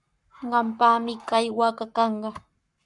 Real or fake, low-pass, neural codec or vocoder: fake; 10.8 kHz; codec, 44.1 kHz, 7.8 kbps, Pupu-Codec